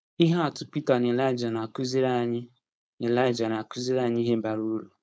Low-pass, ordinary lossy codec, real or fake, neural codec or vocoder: none; none; fake; codec, 16 kHz, 4.8 kbps, FACodec